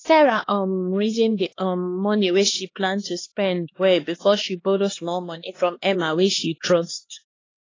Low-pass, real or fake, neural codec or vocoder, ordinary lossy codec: 7.2 kHz; fake; codec, 16 kHz, 2 kbps, X-Codec, HuBERT features, trained on LibriSpeech; AAC, 32 kbps